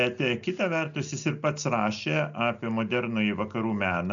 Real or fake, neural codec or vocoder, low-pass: real; none; 7.2 kHz